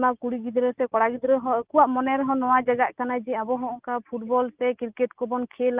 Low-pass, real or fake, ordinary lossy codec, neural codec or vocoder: 3.6 kHz; real; Opus, 24 kbps; none